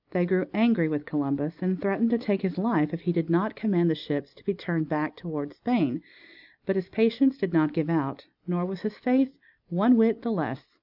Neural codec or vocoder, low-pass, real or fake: none; 5.4 kHz; real